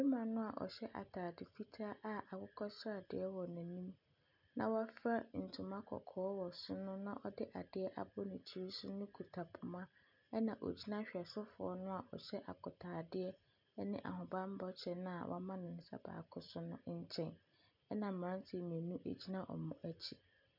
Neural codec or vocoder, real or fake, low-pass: none; real; 5.4 kHz